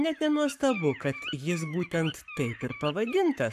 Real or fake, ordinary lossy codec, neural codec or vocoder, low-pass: fake; AAC, 96 kbps; codec, 44.1 kHz, 7.8 kbps, Pupu-Codec; 14.4 kHz